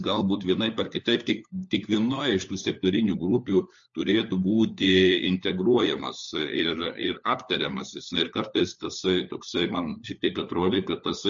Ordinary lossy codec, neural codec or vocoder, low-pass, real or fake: MP3, 48 kbps; codec, 16 kHz, 4 kbps, FunCodec, trained on LibriTTS, 50 frames a second; 7.2 kHz; fake